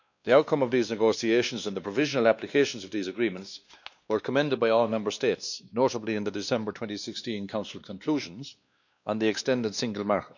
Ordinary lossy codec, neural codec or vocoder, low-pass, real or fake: none; codec, 16 kHz, 2 kbps, X-Codec, WavLM features, trained on Multilingual LibriSpeech; 7.2 kHz; fake